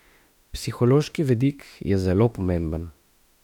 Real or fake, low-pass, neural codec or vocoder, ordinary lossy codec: fake; 19.8 kHz; autoencoder, 48 kHz, 32 numbers a frame, DAC-VAE, trained on Japanese speech; none